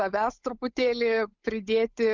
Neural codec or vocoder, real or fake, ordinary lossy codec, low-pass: codec, 16 kHz, 8 kbps, FunCodec, trained on Chinese and English, 25 frames a second; fake; Opus, 64 kbps; 7.2 kHz